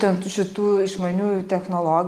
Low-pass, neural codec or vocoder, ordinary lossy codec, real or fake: 14.4 kHz; none; Opus, 24 kbps; real